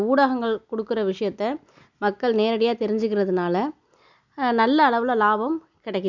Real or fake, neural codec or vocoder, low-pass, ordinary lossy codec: real; none; 7.2 kHz; none